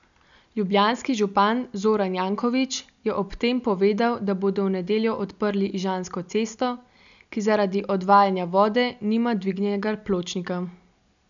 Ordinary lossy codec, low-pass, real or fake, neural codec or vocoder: none; 7.2 kHz; real; none